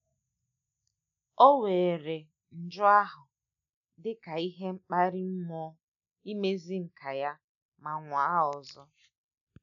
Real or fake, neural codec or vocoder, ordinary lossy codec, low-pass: real; none; none; 7.2 kHz